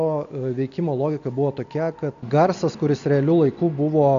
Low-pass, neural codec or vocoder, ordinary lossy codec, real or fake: 7.2 kHz; none; AAC, 96 kbps; real